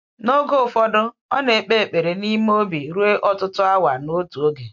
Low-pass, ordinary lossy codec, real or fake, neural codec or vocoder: 7.2 kHz; MP3, 48 kbps; real; none